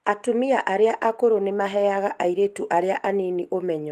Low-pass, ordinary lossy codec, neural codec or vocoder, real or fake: 14.4 kHz; Opus, 24 kbps; none; real